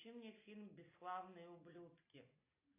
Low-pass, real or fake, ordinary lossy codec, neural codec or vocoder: 3.6 kHz; real; AAC, 16 kbps; none